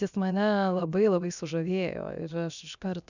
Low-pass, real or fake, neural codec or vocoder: 7.2 kHz; fake; codec, 16 kHz, 0.7 kbps, FocalCodec